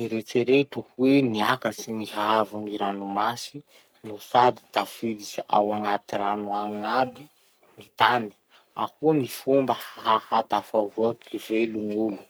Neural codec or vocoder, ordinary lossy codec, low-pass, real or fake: codec, 44.1 kHz, 3.4 kbps, Pupu-Codec; none; none; fake